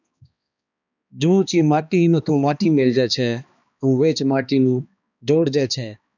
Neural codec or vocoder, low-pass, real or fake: codec, 16 kHz, 2 kbps, X-Codec, HuBERT features, trained on balanced general audio; 7.2 kHz; fake